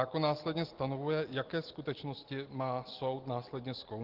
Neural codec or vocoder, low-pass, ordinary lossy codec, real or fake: none; 5.4 kHz; Opus, 16 kbps; real